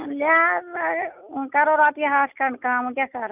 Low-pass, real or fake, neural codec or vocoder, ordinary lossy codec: 3.6 kHz; real; none; none